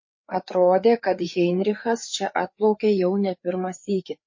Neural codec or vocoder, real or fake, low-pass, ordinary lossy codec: codec, 16 kHz, 4 kbps, FreqCodec, larger model; fake; 7.2 kHz; MP3, 32 kbps